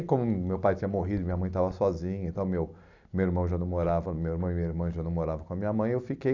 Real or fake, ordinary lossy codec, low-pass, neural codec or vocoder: real; none; 7.2 kHz; none